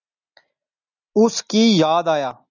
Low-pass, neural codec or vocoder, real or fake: 7.2 kHz; none; real